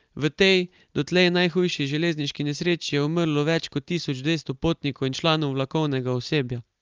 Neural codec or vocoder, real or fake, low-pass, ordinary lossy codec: none; real; 7.2 kHz; Opus, 32 kbps